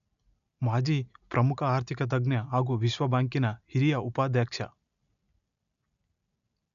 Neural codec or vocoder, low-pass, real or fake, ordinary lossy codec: none; 7.2 kHz; real; none